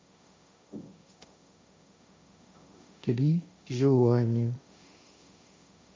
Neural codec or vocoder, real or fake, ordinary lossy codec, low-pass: codec, 16 kHz, 1.1 kbps, Voila-Tokenizer; fake; none; none